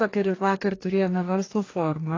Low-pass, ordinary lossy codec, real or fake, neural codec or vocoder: 7.2 kHz; AAC, 32 kbps; fake; codec, 44.1 kHz, 2.6 kbps, DAC